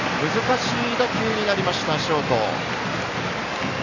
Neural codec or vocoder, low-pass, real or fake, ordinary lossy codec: none; 7.2 kHz; real; none